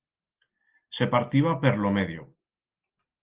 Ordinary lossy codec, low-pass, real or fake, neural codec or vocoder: Opus, 16 kbps; 3.6 kHz; real; none